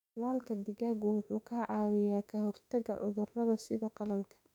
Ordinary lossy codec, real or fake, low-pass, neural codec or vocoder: none; fake; 19.8 kHz; autoencoder, 48 kHz, 32 numbers a frame, DAC-VAE, trained on Japanese speech